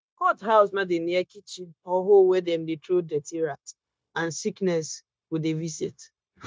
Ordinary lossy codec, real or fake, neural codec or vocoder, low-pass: none; fake; codec, 16 kHz, 0.9 kbps, LongCat-Audio-Codec; none